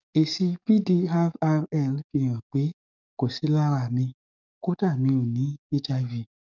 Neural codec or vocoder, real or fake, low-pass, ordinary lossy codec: codec, 44.1 kHz, 7.8 kbps, DAC; fake; 7.2 kHz; none